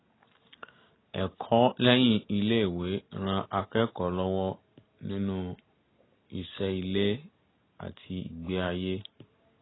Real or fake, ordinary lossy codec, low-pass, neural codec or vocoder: real; AAC, 16 kbps; 7.2 kHz; none